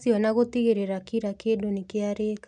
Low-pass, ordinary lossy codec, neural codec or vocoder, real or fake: 9.9 kHz; none; none; real